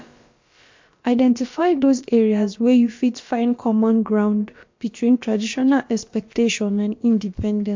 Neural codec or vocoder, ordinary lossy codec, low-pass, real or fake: codec, 16 kHz, about 1 kbps, DyCAST, with the encoder's durations; MP3, 48 kbps; 7.2 kHz; fake